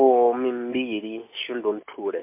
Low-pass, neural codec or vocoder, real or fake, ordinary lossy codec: 3.6 kHz; none; real; MP3, 32 kbps